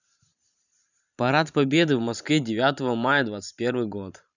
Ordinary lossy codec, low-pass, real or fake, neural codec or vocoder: none; 7.2 kHz; fake; vocoder, 44.1 kHz, 128 mel bands every 512 samples, BigVGAN v2